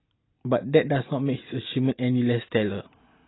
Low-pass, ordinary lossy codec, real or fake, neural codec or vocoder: 7.2 kHz; AAC, 16 kbps; real; none